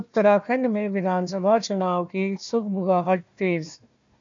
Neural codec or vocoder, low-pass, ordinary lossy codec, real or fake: codec, 16 kHz, 1 kbps, FunCodec, trained on Chinese and English, 50 frames a second; 7.2 kHz; MP3, 64 kbps; fake